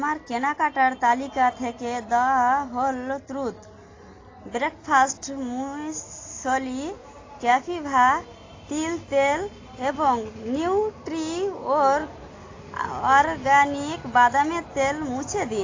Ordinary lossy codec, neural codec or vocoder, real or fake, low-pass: AAC, 32 kbps; none; real; 7.2 kHz